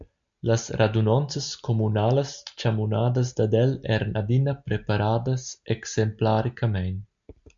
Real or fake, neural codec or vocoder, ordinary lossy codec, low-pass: real; none; MP3, 64 kbps; 7.2 kHz